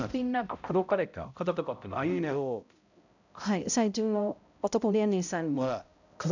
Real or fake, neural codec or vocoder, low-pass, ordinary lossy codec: fake; codec, 16 kHz, 0.5 kbps, X-Codec, HuBERT features, trained on balanced general audio; 7.2 kHz; none